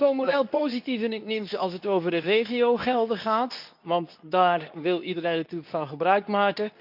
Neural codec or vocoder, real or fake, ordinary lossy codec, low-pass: codec, 24 kHz, 0.9 kbps, WavTokenizer, medium speech release version 2; fake; none; 5.4 kHz